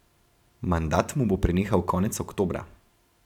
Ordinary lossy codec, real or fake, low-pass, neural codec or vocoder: none; fake; 19.8 kHz; vocoder, 44.1 kHz, 128 mel bands every 512 samples, BigVGAN v2